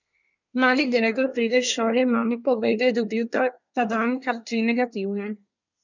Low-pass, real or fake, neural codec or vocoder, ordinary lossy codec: 7.2 kHz; fake; codec, 24 kHz, 1 kbps, SNAC; none